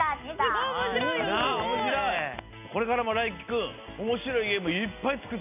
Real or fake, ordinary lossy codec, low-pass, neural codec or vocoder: real; AAC, 32 kbps; 3.6 kHz; none